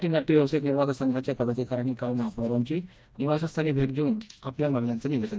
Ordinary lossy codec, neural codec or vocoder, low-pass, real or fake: none; codec, 16 kHz, 1 kbps, FreqCodec, smaller model; none; fake